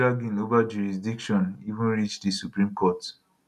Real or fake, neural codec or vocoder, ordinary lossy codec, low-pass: real; none; none; 14.4 kHz